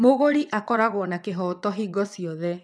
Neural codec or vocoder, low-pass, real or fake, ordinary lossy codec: vocoder, 22.05 kHz, 80 mel bands, WaveNeXt; none; fake; none